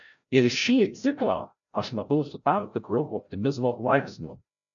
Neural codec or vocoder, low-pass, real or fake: codec, 16 kHz, 0.5 kbps, FreqCodec, larger model; 7.2 kHz; fake